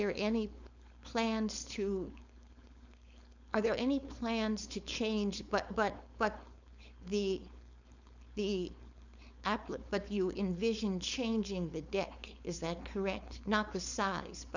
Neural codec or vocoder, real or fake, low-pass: codec, 16 kHz, 4.8 kbps, FACodec; fake; 7.2 kHz